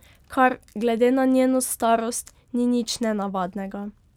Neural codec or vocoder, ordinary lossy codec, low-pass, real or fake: none; none; 19.8 kHz; real